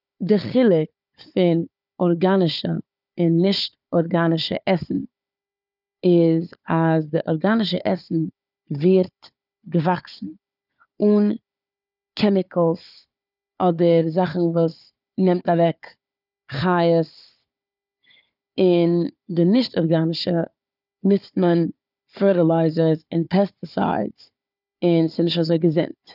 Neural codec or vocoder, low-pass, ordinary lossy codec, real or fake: codec, 16 kHz, 4 kbps, FunCodec, trained on Chinese and English, 50 frames a second; 5.4 kHz; none; fake